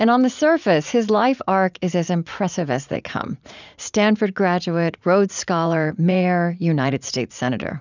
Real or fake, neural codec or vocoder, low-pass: fake; vocoder, 44.1 kHz, 80 mel bands, Vocos; 7.2 kHz